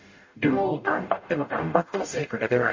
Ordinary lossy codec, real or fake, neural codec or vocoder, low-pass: MP3, 32 kbps; fake; codec, 44.1 kHz, 0.9 kbps, DAC; 7.2 kHz